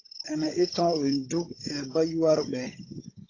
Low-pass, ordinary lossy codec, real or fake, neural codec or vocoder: 7.2 kHz; AAC, 32 kbps; fake; codec, 16 kHz, 16 kbps, FunCodec, trained on Chinese and English, 50 frames a second